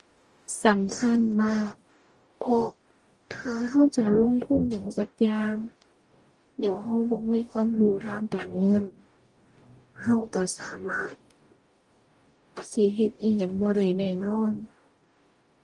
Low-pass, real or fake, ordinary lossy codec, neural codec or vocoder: 10.8 kHz; fake; Opus, 32 kbps; codec, 44.1 kHz, 0.9 kbps, DAC